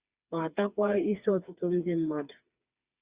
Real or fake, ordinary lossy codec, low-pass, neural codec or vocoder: fake; Opus, 64 kbps; 3.6 kHz; codec, 16 kHz, 4 kbps, FreqCodec, smaller model